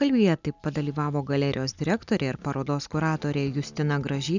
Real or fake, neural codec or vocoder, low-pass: real; none; 7.2 kHz